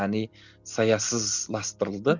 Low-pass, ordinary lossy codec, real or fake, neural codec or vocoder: 7.2 kHz; none; real; none